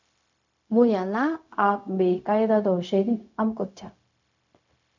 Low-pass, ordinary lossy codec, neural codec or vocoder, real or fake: 7.2 kHz; MP3, 64 kbps; codec, 16 kHz, 0.4 kbps, LongCat-Audio-Codec; fake